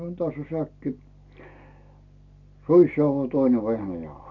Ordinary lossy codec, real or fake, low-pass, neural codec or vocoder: none; real; 7.2 kHz; none